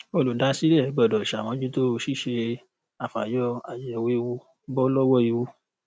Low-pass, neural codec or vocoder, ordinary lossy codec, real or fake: none; none; none; real